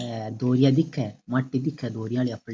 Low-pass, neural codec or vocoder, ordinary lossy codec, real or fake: none; none; none; real